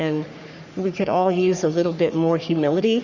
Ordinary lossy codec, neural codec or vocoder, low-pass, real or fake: Opus, 64 kbps; codec, 44.1 kHz, 3.4 kbps, Pupu-Codec; 7.2 kHz; fake